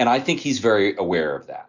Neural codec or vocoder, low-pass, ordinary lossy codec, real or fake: none; 7.2 kHz; Opus, 64 kbps; real